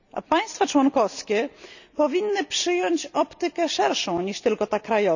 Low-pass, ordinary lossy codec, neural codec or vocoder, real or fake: 7.2 kHz; none; none; real